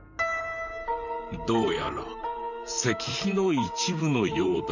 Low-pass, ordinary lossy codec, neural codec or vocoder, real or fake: 7.2 kHz; none; vocoder, 44.1 kHz, 128 mel bands, Pupu-Vocoder; fake